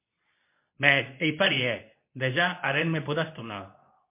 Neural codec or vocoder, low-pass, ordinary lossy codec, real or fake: codec, 24 kHz, 0.9 kbps, WavTokenizer, medium speech release version 2; 3.6 kHz; MP3, 24 kbps; fake